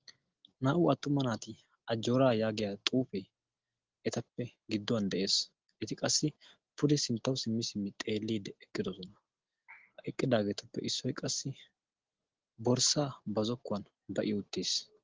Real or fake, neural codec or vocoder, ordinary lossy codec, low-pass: real; none; Opus, 16 kbps; 7.2 kHz